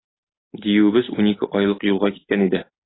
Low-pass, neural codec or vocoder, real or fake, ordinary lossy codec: 7.2 kHz; none; real; AAC, 16 kbps